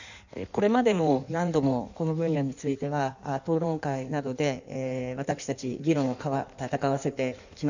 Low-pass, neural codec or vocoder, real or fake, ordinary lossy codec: 7.2 kHz; codec, 16 kHz in and 24 kHz out, 1.1 kbps, FireRedTTS-2 codec; fake; none